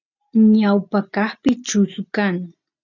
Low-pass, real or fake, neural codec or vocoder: 7.2 kHz; real; none